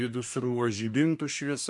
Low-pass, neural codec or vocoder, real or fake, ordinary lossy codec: 10.8 kHz; codec, 24 kHz, 1 kbps, SNAC; fake; MP3, 64 kbps